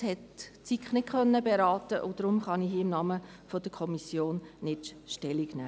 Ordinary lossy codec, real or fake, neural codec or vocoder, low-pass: none; real; none; none